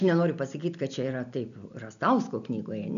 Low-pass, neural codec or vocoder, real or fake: 7.2 kHz; none; real